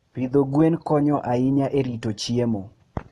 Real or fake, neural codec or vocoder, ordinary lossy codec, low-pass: real; none; AAC, 32 kbps; 19.8 kHz